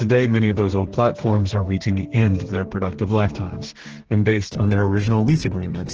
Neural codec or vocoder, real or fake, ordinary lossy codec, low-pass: codec, 32 kHz, 1.9 kbps, SNAC; fake; Opus, 16 kbps; 7.2 kHz